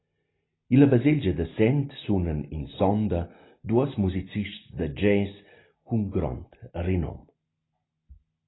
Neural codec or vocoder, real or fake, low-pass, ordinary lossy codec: none; real; 7.2 kHz; AAC, 16 kbps